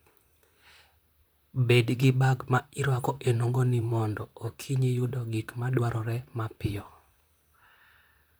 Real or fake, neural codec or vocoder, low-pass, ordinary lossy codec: fake; vocoder, 44.1 kHz, 128 mel bands, Pupu-Vocoder; none; none